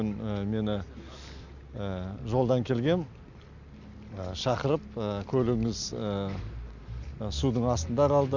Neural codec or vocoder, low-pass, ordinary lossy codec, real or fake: none; 7.2 kHz; none; real